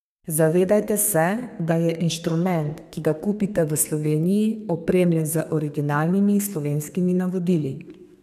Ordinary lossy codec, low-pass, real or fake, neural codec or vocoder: none; 14.4 kHz; fake; codec, 32 kHz, 1.9 kbps, SNAC